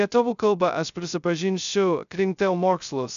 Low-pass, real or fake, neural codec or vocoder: 7.2 kHz; fake; codec, 16 kHz, 0.2 kbps, FocalCodec